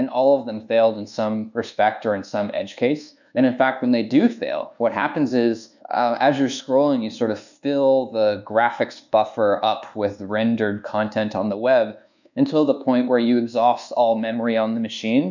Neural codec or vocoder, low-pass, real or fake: codec, 24 kHz, 1.2 kbps, DualCodec; 7.2 kHz; fake